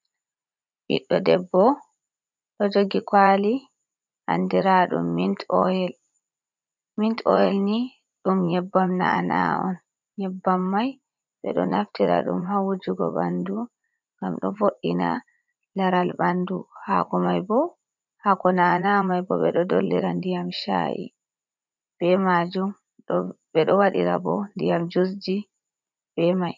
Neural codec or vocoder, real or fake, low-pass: vocoder, 44.1 kHz, 80 mel bands, Vocos; fake; 7.2 kHz